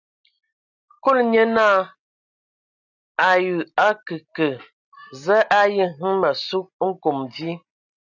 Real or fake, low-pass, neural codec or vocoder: real; 7.2 kHz; none